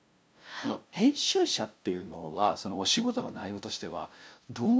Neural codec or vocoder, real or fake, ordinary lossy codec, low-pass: codec, 16 kHz, 0.5 kbps, FunCodec, trained on LibriTTS, 25 frames a second; fake; none; none